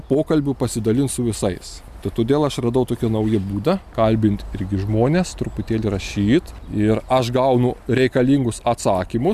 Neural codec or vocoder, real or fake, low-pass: none; real; 14.4 kHz